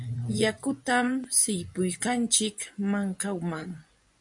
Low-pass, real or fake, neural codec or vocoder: 10.8 kHz; fake; vocoder, 24 kHz, 100 mel bands, Vocos